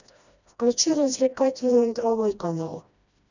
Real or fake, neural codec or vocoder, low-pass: fake; codec, 16 kHz, 1 kbps, FreqCodec, smaller model; 7.2 kHz